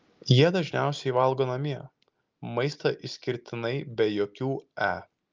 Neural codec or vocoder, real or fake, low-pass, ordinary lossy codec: none; real; 7.2 kHz; Opus, 32 kbps